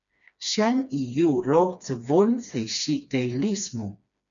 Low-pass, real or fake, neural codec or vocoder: 7.2 kHz; fake; codec, 16 kHz, 2 kbps, FreqCodec, smaller model